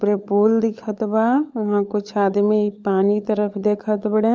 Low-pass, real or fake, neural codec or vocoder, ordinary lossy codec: none; fake; codec, 16 kHz, 16 kbps, FunCodec, trained on LibriTTS, 50 frames a second; none